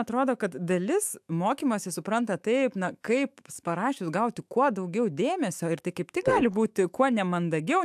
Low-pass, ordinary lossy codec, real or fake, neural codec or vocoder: 14.4 kHz; AAC, 96 kbps; fake; autoencoder, 48 kHz, 128 numbers a frame, DAC-VAE, trained on Japanese speech